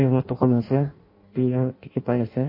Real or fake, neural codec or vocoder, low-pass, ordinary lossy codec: fake; codec, 16 kHz in and 24 kHz out, 0.6 kbps, FireRedTTS-2 codec; 5.4 kHz; MP3, 32 kbps